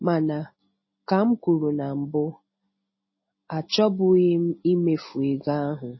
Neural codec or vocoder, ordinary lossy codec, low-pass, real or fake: none; MP3, 24 kbps; 7.2 kHz; real